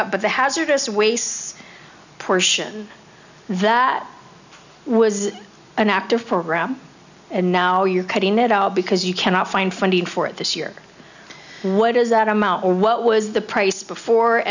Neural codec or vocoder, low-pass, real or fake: none; 7.2 kHz; real